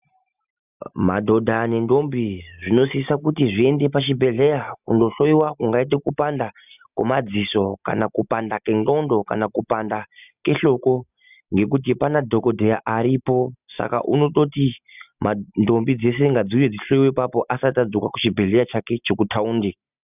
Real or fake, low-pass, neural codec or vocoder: real; 3.6 kHz; none